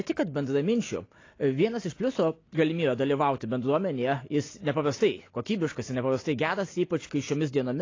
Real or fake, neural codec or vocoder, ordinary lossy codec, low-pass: real; none; AAC, 32 kbps; 7.2 kHz